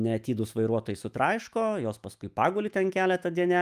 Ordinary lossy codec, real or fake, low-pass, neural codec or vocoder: Opus, 32 kbps; fake; 14.4 kHz; autoencoder, 48 kHz, 128 numbers a frame, DAC-VAE, trained on Japanese speech